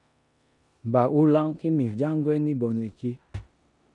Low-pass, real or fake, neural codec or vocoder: 10.8 kHz; fake; codec, 16 kHz in and 24 kHz out, 0.9 kbps, LongCat-Audio-Codec, four codebook decoder